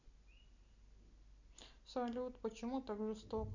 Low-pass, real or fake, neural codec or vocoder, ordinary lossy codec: 7.2 kHz; real; none; none